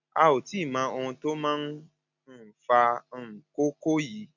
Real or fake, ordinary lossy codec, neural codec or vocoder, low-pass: real; none; none; 7.2 kHz